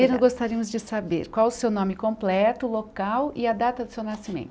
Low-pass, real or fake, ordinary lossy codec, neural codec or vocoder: none; real; none; none